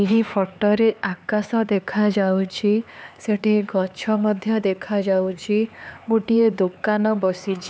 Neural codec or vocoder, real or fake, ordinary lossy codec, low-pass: codec, 16 kHz, 4 kbps, X-Codec, HuBERT features, trained on LibriSpeech; fake; none; none